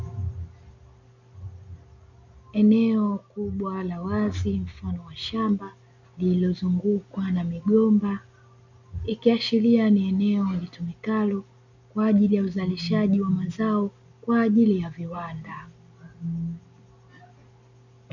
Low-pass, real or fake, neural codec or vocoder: 7.2 kHz; real; none